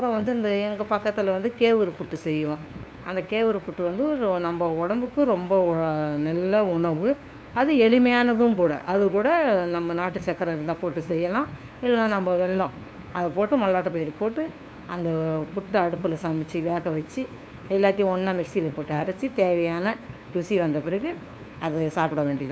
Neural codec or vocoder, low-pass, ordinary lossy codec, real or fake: codec, 16 kHz, 2 kbps, FunCodec, trained on LibriTTS, 25 frames a second; none; none; fake